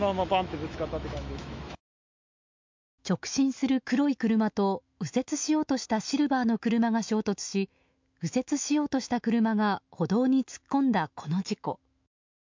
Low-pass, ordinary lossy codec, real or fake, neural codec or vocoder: 7.2 kHz; MP3, 64 kbps; fake; autoencoder, 48 kHz, 128 numbers a frame, DAC-VAE, trained on Japanese speech